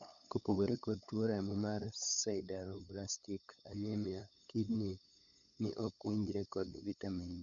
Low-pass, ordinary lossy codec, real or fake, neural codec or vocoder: 7.2 kHz; none; fake; codec, 16 kHz, 8 kbps, FunCodec, trained on LibriTTS, 25 frames a second